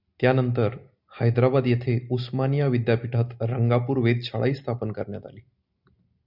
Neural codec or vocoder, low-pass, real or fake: none; 5.4 kHz; real